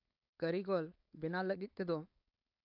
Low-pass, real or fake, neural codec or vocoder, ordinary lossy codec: 5.4 kHz; fake; codec, 16 kHz, 4.8 kbps, FACodec; AAC, 32 kbps